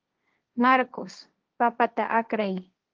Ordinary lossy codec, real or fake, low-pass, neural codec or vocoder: Opus, 32 kbps; fake; 7.2 kHz; codec, 16 kHz, 1.1 kbps, Voila-Tokenizer